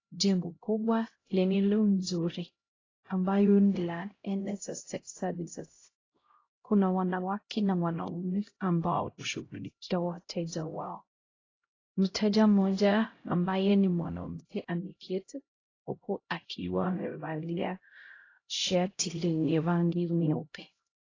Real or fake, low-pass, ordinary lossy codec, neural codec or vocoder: fake; 7.2 kHz; AAC, 32 kbps; codec, 16 kHz, 0.5 kbps, X-Codec, HuBERT features, trained on LibriSpeech